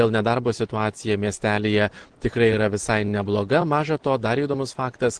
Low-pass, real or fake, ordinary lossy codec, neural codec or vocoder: 9.9 kHz; real; Opus, 16 kbps; none